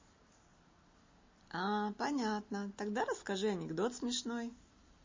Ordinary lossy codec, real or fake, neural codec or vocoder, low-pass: MP3, 32 kbps; real; none; 7.2 kHz